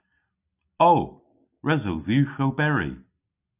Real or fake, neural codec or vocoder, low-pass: real; none; 3.6 kHz